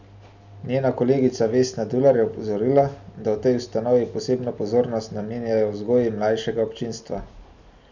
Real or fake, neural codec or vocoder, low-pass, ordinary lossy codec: real; none; 7.2 kHz; none